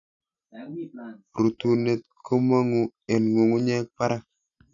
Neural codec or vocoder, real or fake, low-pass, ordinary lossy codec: none; real; 7.2 kHz; none